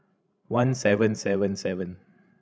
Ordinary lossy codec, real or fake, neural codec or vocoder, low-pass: none; fake; codec, 16 kHz, 16 kbps, FreqCodec, larger model; none